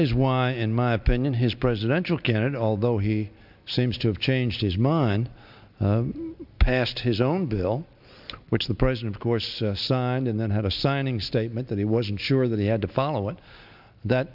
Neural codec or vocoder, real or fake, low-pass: none; real; 5.4 kHz